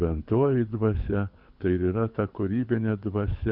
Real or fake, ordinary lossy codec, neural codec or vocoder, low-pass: fake; MP3, 48 kbps; codec, 24 kHz, 6 kbps, HILCodec; 5.4 kHz